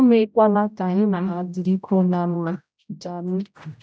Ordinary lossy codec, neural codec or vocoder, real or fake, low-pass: none; codec, 16 kHz, 0.5 kbps, X-Codec, HuBERT features, trained on general audio; fake; none